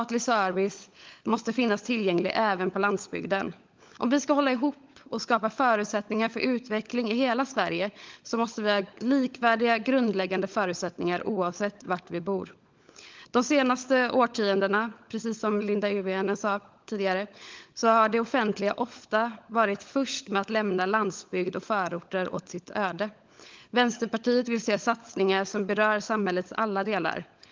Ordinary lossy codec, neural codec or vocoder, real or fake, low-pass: Opus, 32 kbps; codec, 16 kHz, 16 kbps, FunCodec, trained on LibriTTS, 50 frames a second; fake; 7.2 kHz